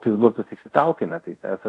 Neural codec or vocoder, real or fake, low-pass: codec, 24 kHz, 0.5 kbps, DualCodec; fake; 10.8 kHz